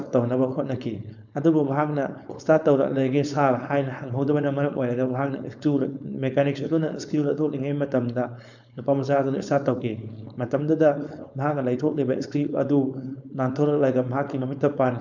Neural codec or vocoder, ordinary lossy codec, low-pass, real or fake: codec, 16 kHz, 4.8 kbps, FACodec; none; 7.2 kHz; fake